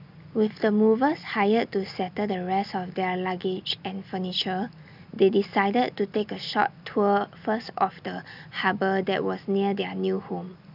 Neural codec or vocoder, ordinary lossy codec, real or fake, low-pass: none; none; real; 5.4 kHz